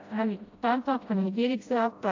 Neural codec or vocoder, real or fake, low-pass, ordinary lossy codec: codec, 16 kHz, 0.5 kbps, FreqCodec, smaller model; fake; 7.2 kHz; AAC, 48 kbps